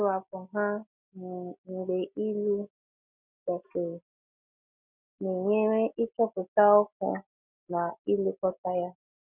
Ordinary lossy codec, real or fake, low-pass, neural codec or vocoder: none; real; 3.6 kHz; none